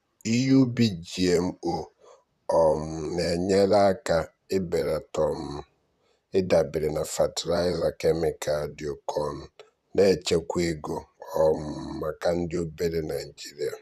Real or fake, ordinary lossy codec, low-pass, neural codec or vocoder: fake; none; 14.4 kHz; vocoder, 44.1 kHz, 128 mel bands, Pupu-Vocoder